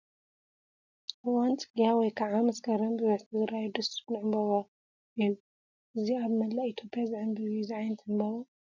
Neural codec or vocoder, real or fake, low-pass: none; real; 7.2 kHz